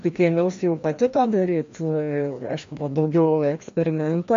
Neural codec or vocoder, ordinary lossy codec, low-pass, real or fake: codec, 16 kHz, 1 kbps, FreqCodec, larger model; MP3, 48 kbps; 7.2 kHz; fake